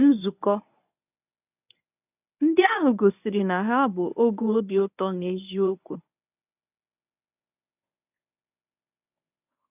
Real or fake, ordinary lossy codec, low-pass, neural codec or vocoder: fake; none; 3.6 kHz; codec, 24 kHz, 0.9 kbps, WavTokenizer, medium speech release version 1